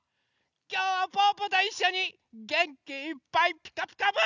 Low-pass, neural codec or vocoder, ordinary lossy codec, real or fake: 7.2 kHz; none; none; real